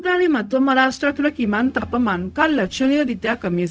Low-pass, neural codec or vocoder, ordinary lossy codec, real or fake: none; codec, 16 kHz, 0.4 kbps, LongCat-Audio-Codec; none; fake